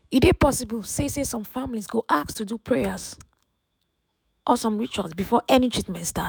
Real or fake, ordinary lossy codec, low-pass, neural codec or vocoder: fake; none; none; autoencoder, 48 kHz, 128 numbers a frame, DAC-VAE, trained on Japanese speech